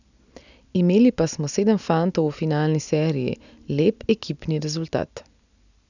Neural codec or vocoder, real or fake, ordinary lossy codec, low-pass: none; real; none; 7.2 kHz